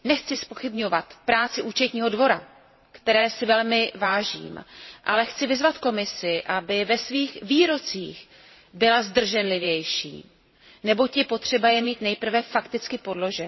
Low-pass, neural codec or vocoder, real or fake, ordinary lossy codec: 7.2 kHz; vocoder, 44.1 kHz, 128 mel bands every 512 samples, BigVGAN v2; fake; MP3, 24 kbps